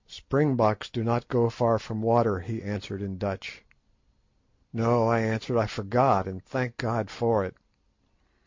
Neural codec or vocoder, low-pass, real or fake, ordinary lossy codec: none; 7.2 kHz; real; MP3, 48 kbps